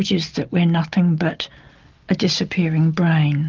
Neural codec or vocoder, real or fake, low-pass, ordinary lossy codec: none; real; 7.2 kHz; Opus, 24 kbps